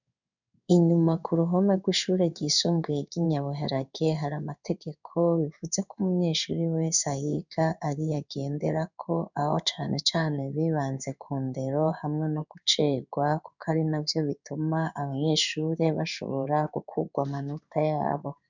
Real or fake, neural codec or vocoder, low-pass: fake; codec, 16 kHz in and 24 kHz out, 1 kbps, XY-Tokenizer; 7.2 kHz